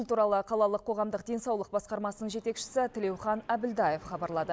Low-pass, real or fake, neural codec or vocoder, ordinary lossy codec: none; real; none; none